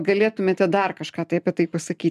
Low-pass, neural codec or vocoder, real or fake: 14.4 kHz; none; real